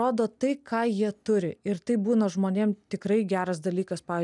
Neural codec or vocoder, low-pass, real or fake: none; 10.8 kHz; real